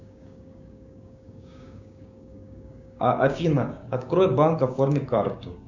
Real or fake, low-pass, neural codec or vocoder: fake; 7.2 kHz; codec, 16 kHz, 6 kbps, DAC